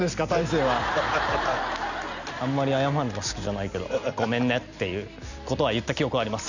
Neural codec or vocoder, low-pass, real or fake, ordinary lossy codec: none; 7.2 kHz; real; none